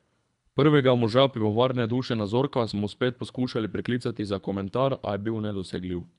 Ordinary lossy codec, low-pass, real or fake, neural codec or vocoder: none; 10.8 kHz; fake; codec, 24 kHz, 3 kbps, HILCodec